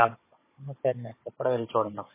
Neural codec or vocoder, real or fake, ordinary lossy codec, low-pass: codec, 16 kHz, 16 kbps, FunCodec, trained on Chinese and English, 50 frames a second; fake; MP3, 16 kbps; 3.6 kHz